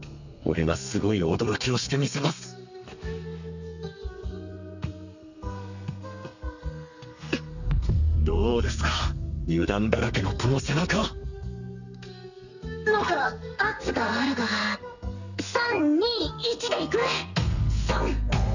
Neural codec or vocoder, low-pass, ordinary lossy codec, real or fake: codec, 32 kHz, 1.9 kbps, SNAC; 7.2 kHz; none; fake